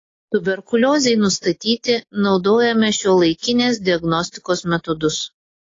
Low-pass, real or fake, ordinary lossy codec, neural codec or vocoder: 7.2 kHz; real; AAC, 32 kbps; none